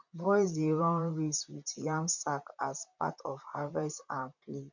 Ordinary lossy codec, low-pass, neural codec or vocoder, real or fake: none; 7.2 kHz; vocoder, 44.1 kHz, 128 mel bands, Pupu-Vocoder; fake